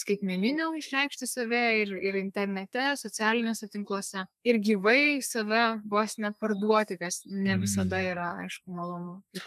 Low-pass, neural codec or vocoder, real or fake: 14.4 kHz; codec, 32 kHz, 1.9 kbps, SNAC; fake